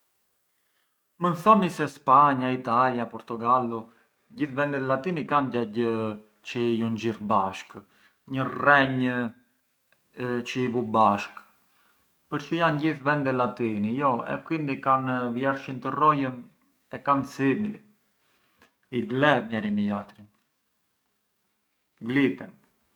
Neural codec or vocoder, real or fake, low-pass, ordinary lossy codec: codec, 44.1 kHz, 7.8 kbps, DAC; fake; none; none